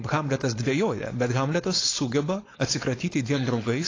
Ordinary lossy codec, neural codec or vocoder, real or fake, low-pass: AAC, 32 kbps; codec, 16 kHz, 4.8 kbps, FACodec; fake; 7.2 kHz